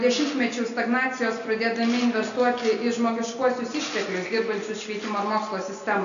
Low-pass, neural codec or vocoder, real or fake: 7.2 kHz; none; real